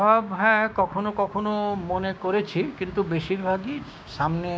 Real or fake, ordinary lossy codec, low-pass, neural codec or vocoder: fake; none; none; codec, 16 kHz, 6 kbps, DAC